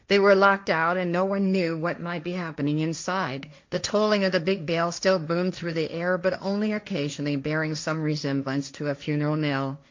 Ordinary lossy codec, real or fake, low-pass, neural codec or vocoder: MP3, 48 kbps; fake; 7.2 kHz; codec, 16 kHz, 1.1 kbps, Voila-Tokenizer